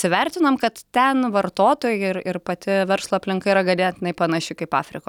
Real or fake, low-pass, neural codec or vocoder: real; 19.8 kHz; none